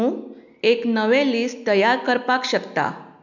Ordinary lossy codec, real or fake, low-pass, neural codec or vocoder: none; real; 7.2 kHz; none